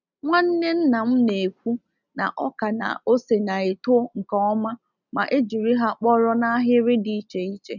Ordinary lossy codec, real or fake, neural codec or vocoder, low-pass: none; real; none; 7.2 kHz